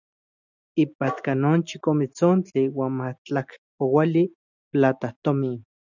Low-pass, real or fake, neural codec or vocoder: 7.2 kHz; real; none